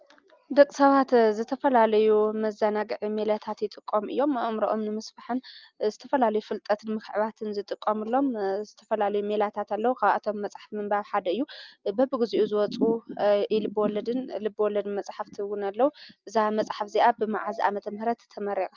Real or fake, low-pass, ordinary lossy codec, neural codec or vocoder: real; 7.2 kHz; Opus, 24 kbps; none